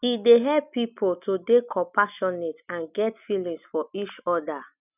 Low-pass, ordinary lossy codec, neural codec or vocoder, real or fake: 3.6 kHz; none; none; real